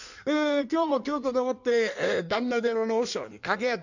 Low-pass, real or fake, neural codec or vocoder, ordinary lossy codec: 7.2 kHz; fake; codec, 16 kHz in and 24 kHz out, 1.1 kbps, FireRedTTS-2 codec; none